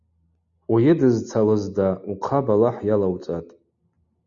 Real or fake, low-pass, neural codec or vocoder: real; 7.2 kHz; none